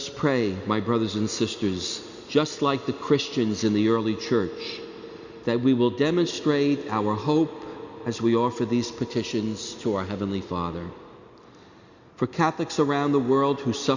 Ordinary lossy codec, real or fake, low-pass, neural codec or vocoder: Opus, 64 kbps; real; 7.2 kHz; none